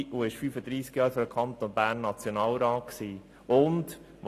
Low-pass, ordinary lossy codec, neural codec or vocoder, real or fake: 14.4 kHz; AAC, 48 kbps; none; real